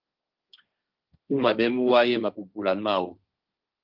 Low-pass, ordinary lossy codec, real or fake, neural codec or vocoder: 5.4 kHz; Opus, 24 kbps; fake; codec, 16 kHz, 1.1 kbps, Voila-Tokenizer